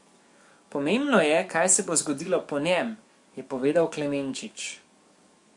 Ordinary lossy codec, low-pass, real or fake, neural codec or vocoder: MP3, 64 kbps; 10.8 kHz; fake; codec, 44.1 kHz, 7.8 kbps, DAC